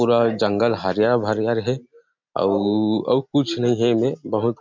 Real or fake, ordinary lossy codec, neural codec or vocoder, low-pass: real; MP3, 64 kbps; none; 7.2 kHz